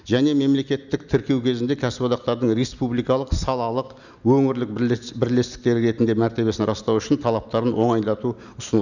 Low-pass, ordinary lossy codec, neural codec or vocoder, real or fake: 7.2 kHz; none; none; real